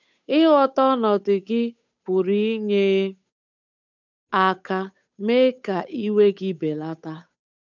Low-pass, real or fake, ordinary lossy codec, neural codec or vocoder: 7.2 kHz; fake; none; codec, 16 kHz, 8 kbps, FunCodec, trained on Chinese and English, 25 frames a second